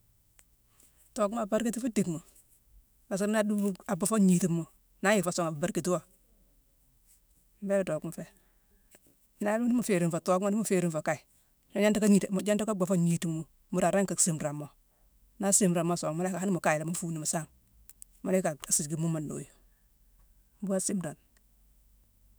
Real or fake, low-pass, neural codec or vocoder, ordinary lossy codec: fake; none; autoencoder, 48 kHz, 128 numbers a frame, DAC-VAE, trained on Japanese speech; none